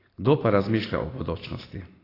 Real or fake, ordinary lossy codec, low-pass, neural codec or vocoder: real; AAC, 24 kbps; 5.4 kHz; none